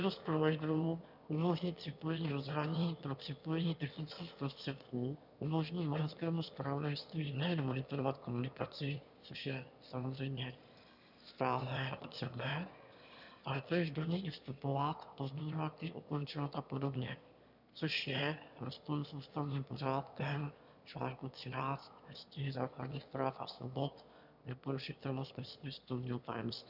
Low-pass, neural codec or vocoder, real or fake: 5.4 kHz; autoencoder, 22.05 kHz, a latent of 192 numbers a frame, VITS, trained on one speaker; fake